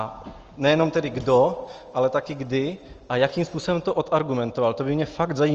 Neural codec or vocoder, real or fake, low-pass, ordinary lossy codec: none; real; 7.2 kHz; Opus, 24 kbps